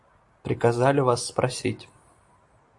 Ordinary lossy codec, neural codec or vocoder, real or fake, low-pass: AAC, 64 kbps; vocoder, 44.1 kHz, 128 mel bands every 256 samples, BigVGAN v2; fake; 10.8 kHz